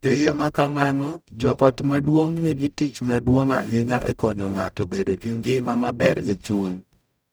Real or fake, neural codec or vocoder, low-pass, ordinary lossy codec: fake; codec, 44.1 kHz, 0.9 kbps, DAC; none; none